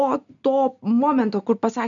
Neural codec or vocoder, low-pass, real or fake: none; 7.2 kHz; real